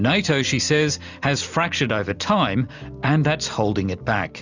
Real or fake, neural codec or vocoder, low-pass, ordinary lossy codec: real; none; 7.2 kHz; Opus, 64 kbps